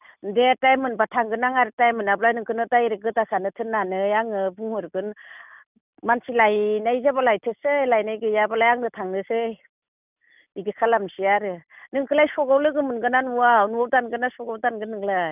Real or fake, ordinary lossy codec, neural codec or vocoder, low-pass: real; none; none; 3.6 kHz